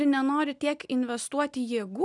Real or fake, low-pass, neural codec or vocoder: real; 10.8 kHz; none